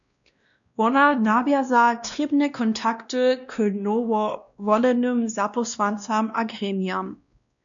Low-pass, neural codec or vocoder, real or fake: 7.2 kHz; codec, 16 kHz, 1 kbps, X-Codec, WavLM features, trained on Multilingual LibriSpeech; fake